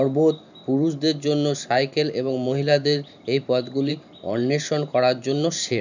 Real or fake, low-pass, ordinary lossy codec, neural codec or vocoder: fake; 7.2 kHz; none; vocoder, 44.1 kHz, 128 mel bands every 512 samples, BigVGAN v2